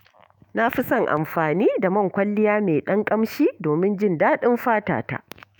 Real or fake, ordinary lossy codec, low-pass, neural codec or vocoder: fake; none; 19.8 kHz; autoencoder, 48 kHz, 128 numbers a frame, DAC-VAE, trained on Japanese speech